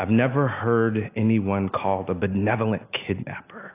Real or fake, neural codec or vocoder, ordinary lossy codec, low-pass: real; none; AAC, 24 kbps; 3.6 kHz